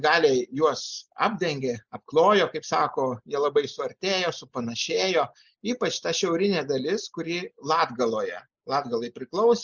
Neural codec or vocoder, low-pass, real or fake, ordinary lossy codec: none; 7.2 kHz; real; Opus, 64 kbps